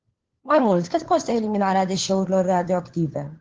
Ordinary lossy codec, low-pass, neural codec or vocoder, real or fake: Opus, 16 kbps; 7.2 kHz; codec, 16 kHz, 4 kbps, FunCodec, trained on LibriTTS, 50 frames a second; fake